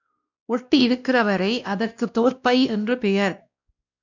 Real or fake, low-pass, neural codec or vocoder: fake; 7.2 kHz; codec, 16 kHz, 1 kbps, X-Codec, WavLM features, trained on Multilingual LibriSpeech